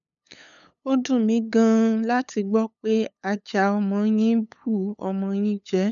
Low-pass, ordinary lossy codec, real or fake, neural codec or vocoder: 7.2 kHz; none; fake; codec, 16 kHz, 8 kbps, FunCodec, trained on LibriTTS, 25 frames a second